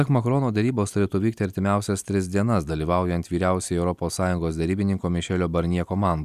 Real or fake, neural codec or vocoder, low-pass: real; none; 14.4 kHz